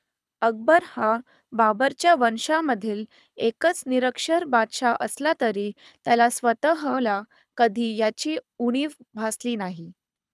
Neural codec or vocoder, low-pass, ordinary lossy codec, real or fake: codec, 24 kHz, 6 kbps, HILCodec; none; none; fake